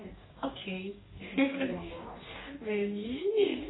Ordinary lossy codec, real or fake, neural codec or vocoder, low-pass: AAC, 16 kbps; fake; codec, 44.1 kHz, 2.6 kbps, DAC; 7.2 kHz